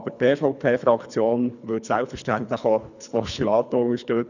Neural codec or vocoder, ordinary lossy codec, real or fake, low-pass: codec, 24 kHz, 3 kbps, HILCodec; none; fake; 7.2 kHz